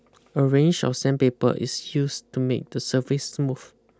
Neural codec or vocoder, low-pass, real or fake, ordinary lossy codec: none; none; real; none